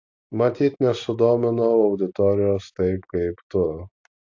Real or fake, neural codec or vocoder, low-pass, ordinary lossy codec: real; none; 7.2 kHz; AAC, 48 kbps